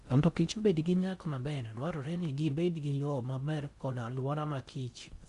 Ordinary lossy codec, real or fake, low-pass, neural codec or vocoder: Opus, 64 kbps; fake; 10.8 kHz; codec, 16 kHz in and 24 kHz out, 0.6 kbps, FocalCodec, streaming, 4096 codes